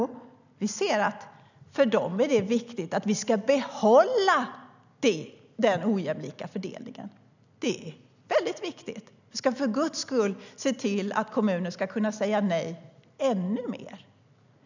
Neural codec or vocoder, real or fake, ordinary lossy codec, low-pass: none; real; none; 7.2 kHz